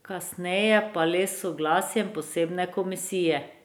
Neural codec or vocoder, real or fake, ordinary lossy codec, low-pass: none; real; none; none